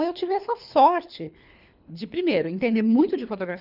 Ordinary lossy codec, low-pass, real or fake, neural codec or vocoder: none; 5.4 kHz; fake; codec, 24 kHz, 3 kbps, HILCodec